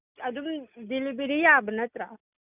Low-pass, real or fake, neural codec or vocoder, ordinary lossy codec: 3.6 kHz; real; none; none